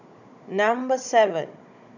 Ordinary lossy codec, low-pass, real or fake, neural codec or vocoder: none; 7.2 kHz; fake; codec, 16 kHz, 16 kbps, FunCodec, trained on Chinese and English, 50 frames a second